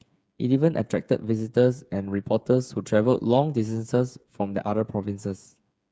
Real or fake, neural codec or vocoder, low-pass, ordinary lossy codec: fake; codec, 16 kHz, 8 kbps, FreqCodec, smaller model; none; none